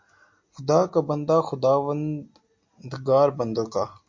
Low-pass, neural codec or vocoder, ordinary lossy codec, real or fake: 7.2 kHz; none; MP3, 48 kbps; real